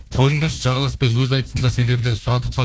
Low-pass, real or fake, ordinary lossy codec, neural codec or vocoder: none; fake; none; codec, 16 kHz, 1 kbps, FunCodec, trained on Chinese and English, 50 frames a second